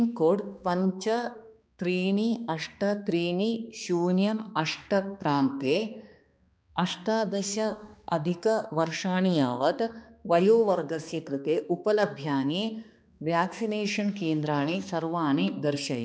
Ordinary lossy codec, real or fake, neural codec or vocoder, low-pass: none; fake; codec, 16 kHz, 2 kbps, X-Codec, HuBERT features, trained on balanced general audio; none